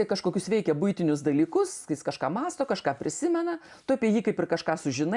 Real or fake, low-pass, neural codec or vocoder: real; 10.8 kHz; none